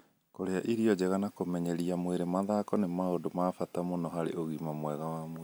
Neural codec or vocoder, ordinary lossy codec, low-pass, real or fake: none; none; none; real